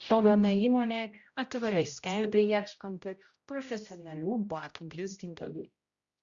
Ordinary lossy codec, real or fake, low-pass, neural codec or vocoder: Opus, 64 kbps; fake; 7.2 kHz; codec, 16 kHz, 0.5 kbps, X-Codec, HuBERT features, trained on general audio